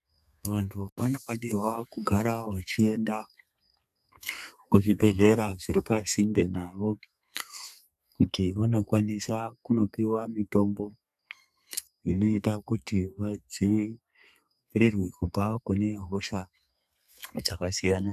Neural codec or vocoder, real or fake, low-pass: codec, 32 kHz, 1.9 kbps, SNAC; fake; 14.4 kHz